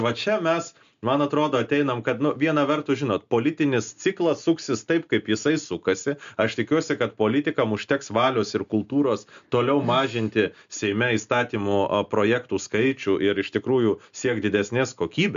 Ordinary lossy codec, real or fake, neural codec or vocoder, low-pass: MP3, 64 kbps; real; none; 7.2 kHz